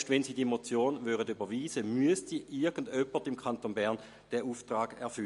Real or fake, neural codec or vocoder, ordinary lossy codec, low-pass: real; none; MP3, 48 kbps; 14.4 kHz